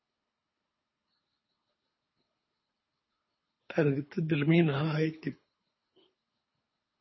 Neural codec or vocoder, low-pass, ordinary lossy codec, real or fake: codec, 24 kHz, 3 kbps, HILCodec; 7.2 kHz; MP3, 24 kbps; fake